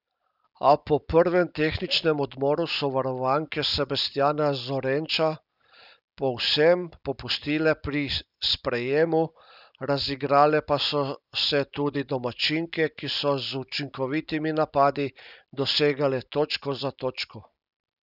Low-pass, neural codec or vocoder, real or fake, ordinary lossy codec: 5.4 kHz; none; real; none